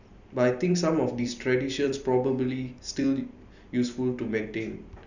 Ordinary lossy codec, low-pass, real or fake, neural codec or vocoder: none; 7.2 kHz; fake; vocoder, 44.1 kHz, 128 mel bands every 256 samples, BigVGAN v2